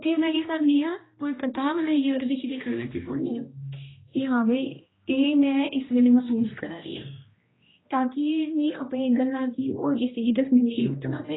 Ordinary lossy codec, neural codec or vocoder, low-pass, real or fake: AAC, 16 kbps; codec, 16 kHz, 1 kbps, X-Codec, HuBERT features, trained on general audio; 7.2 kHz; fake